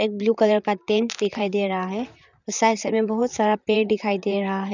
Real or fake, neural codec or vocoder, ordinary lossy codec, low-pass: fake; vocoder, 44.1 kHz, 128 mel bands, Pupu-Vocoder; none; 7.2 kHz